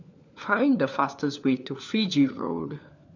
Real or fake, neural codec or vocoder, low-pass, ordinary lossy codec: fake; codec, 16 kHz, 4 kbps, FunCodec, trained on LibriTTS, 50 frames a second; 7.2 kHz; none